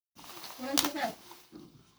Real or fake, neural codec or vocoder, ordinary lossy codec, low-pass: fake; codec, 44.1 kHz, 7.8 kbps, Pupu-Codec; none; none